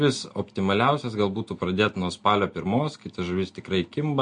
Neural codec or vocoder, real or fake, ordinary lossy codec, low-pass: none; real; MP3, 48 kbps; 9.9 kHz